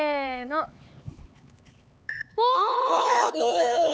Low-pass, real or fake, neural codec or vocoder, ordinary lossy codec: none; fake; codec, 16 kHz, 4 kbps, X-Codec, HuBERT features, trained on LibriSpeech; none